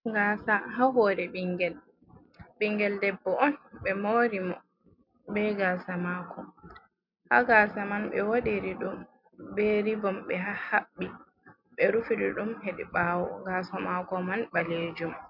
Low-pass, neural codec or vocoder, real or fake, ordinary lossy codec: 5.4 kHz; none; real; MP3, 48 kbps